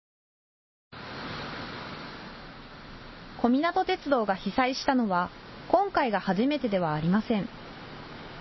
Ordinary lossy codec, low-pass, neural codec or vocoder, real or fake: MP3, 24 kbps; 7.2 kHz; none; real